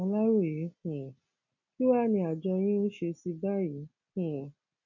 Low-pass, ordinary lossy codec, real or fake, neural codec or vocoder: 7.2 kHz; none; real; none